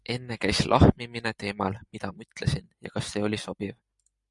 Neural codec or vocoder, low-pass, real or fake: none; 10.8 kHz; real